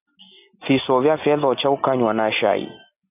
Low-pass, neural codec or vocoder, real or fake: 3.6 kHz; none; real